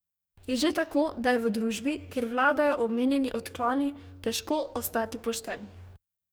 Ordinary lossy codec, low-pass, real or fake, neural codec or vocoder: none; none; fake; codec, 44.1 kHz, 2.6 kbps, DAC